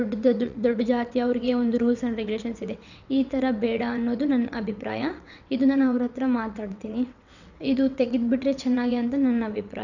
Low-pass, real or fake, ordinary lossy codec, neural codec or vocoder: 7.2 kHz; fake; none; vocoder, 22.05 kHz, 80 mel bands, WaveNeXt